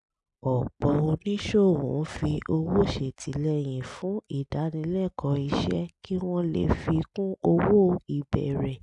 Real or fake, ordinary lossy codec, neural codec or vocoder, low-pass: real; none; none; 10.8 kHz